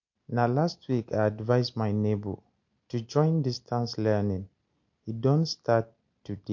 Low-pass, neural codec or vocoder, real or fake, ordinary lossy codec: 7.2 kHz; none; real; MP3, 48 kbps